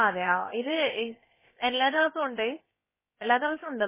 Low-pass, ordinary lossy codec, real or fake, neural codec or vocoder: 3.6 kHz; MP3, 16 kbps; fake; codec, 16 kHz, about 1 kbps, DyCAST, with the encoder's durations